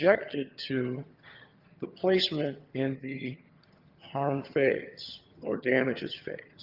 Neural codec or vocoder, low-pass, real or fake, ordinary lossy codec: vocoder, 22.05 kHz, 80 mel bands, HiFi-GAN; 5.4 kHz; fake; Opus, 32 kbps